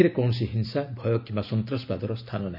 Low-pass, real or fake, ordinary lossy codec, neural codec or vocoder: 5.4 kHz; real; none; none